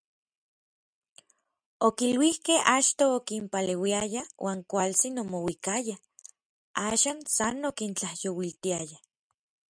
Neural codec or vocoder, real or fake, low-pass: none; real; 9.9 kHz